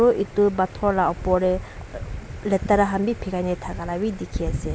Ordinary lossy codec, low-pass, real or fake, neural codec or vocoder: none; none; real; none